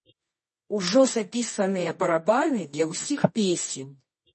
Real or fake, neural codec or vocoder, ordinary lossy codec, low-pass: fake; codec, 24 kHz, 0.9 kbps, WavTokenizer, medium music audio release; MP3, 32 kbps; 10.8 kHz